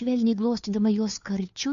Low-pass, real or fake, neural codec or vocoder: 7.2 kHz; fake; codec, 16 kHz, 2 kbps, FunCodec, trained on Chinese and English, 25 frames a second